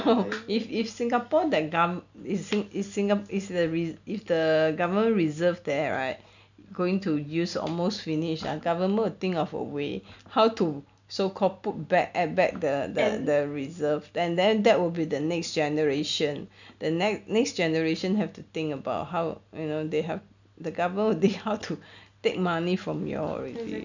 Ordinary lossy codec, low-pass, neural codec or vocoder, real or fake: none; 7.2 kHz; none; real